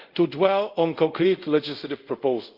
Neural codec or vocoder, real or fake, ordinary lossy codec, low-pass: codec, 24 kHz, 0.5 kbps, DualCodec; fake; Opus, 32 kbps; 5.4 kHz